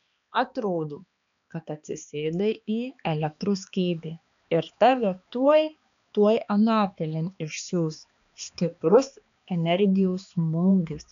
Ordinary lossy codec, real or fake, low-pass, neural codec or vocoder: MP3, 96 kbps; fake; 7.2 kHz; codec, 16 kHz, 2 kbps, X-Codec, HuBERT features, trained on balanced general audio